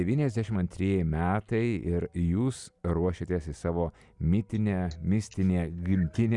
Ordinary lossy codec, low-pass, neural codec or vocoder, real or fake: Opus, 64 kbps; 10.8 kHz; none; real